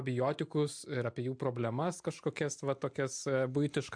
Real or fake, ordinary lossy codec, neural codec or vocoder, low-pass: real; MP3, 64 kbps; none; 9.9 kHz